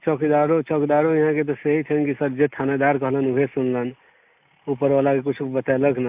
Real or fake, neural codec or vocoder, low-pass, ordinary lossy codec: real; none; 3.6 kHz; AAC, 32 kbps